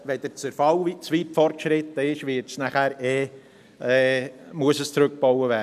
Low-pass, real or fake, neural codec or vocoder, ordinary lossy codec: 14.4 kHz; real; none; none